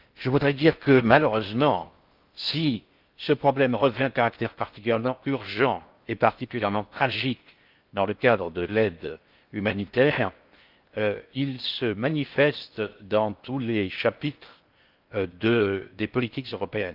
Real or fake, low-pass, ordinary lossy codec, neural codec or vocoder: fake; 5.4 kHz; Opus, 32 kbps; codec, 16 kHz in and 24 kHz out, 0.6 kbps, FocalCodec, streaming, 4096 codes